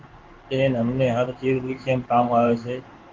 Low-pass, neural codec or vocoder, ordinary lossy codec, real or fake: 7.2 kHz; codec, 16 kHz in and 24 kHz out, 1 kbps, XY-Tokenizer; Opus, 16 kbps; fake